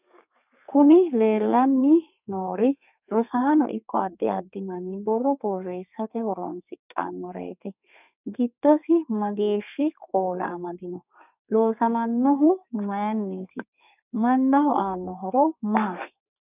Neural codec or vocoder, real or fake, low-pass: codec, 32 kHz, 1.9 kbps, SNAC; fake; 3.6 kHz